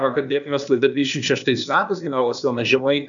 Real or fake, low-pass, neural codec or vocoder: fake; 7.2 kHz; codec, 16 kHz, 0.8 kbps, ZipCodec